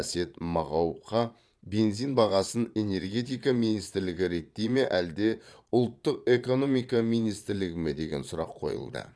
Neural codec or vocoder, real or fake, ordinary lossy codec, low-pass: vocoder, 22.05 kHz, 80 mel bands, Vocos; fake; none; none